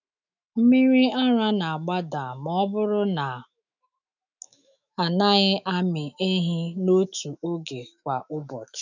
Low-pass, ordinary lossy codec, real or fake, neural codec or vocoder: 7.2 kHz; none; fake; autoencoder, 48 kHz, 128 numbers a frame, DAC-VAE, trained on Japanese speech